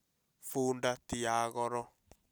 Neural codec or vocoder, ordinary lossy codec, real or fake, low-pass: none; none; real; none